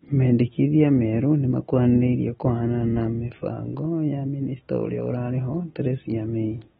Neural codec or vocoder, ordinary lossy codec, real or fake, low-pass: none; AAC, 16 kbps; real; 7.2 kHz